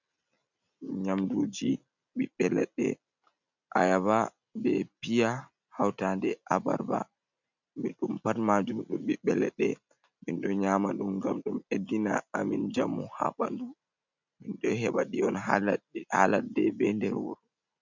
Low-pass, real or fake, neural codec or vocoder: 7.2 kHz; real; none